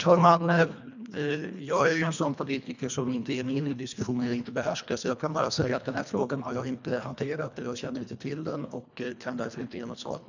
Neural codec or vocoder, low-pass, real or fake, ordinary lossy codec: codec, 24 kHz, 1.5 kbps, HILCodec; 7.2 kHz; fake; none